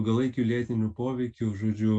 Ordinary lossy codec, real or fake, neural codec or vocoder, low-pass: MP3, 64 kbps; real; none; 9.9 kHz